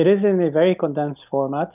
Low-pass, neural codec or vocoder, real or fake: 3.6 kHz; none; real